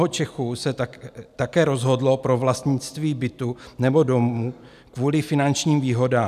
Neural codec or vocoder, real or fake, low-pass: none; real; 14.4 kHz